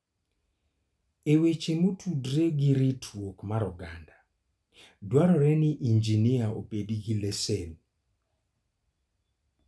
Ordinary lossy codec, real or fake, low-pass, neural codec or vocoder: none; real; none; none